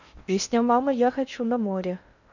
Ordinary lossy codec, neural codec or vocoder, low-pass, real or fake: none; codec, 16 kHz in and 24 kHz out, 0.6 kbps, FocalCodec, streaming, 4096 codes; 7.2 kHz; fake